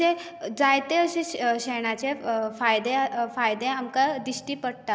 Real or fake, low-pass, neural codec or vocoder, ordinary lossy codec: real; none; none; none